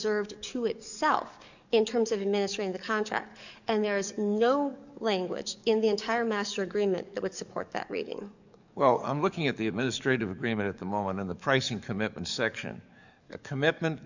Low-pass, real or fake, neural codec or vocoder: 7.2 kHz; fake; codec, 44.1 kHz, 7.8 kbps, DAC